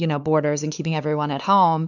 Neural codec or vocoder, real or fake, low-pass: codec, 16 kHz, 4 kbps, X-Codec, WavLM features, trained on Multilingual LibriSpeech; fake; 7.2 kHz